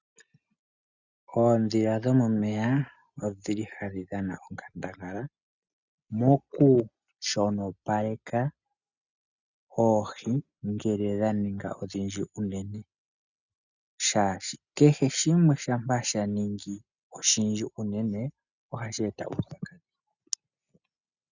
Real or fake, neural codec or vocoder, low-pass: real; none; 7.2 kHz